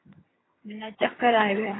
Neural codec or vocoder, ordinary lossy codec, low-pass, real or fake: vocoder, 22.05 kHz, 80 mel bands, HiFi-GAN; AAC, 16 kbps; 7.2 kHz; fake